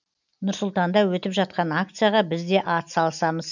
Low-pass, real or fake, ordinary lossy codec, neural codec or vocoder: 7.2 kHz; real; none; none